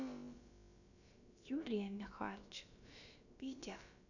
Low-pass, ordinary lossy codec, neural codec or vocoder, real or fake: 7.2 kHz; none; codec, 16 kHz, about 1 kbps, DyCAST, with the encoder's durations; fake